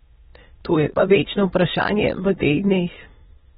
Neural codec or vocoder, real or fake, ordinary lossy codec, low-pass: autoencoder, 22.05 kHz, a latent of 192 numbers a frame, VITS, trained on many speakers; fake; AAC, 16 kbps; 9.9 kHz